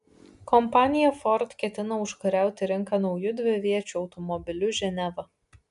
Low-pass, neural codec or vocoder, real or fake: 10.8 kHz; none; real